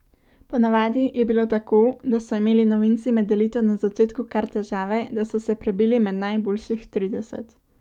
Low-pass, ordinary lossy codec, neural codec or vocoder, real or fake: 19.8 kHz; none; codec, 44.1 kHz, 7.8 kbps, DAC; fake